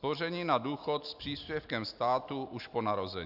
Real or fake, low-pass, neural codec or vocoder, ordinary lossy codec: real; 5.4 kHz; none; AAC, 48 kbps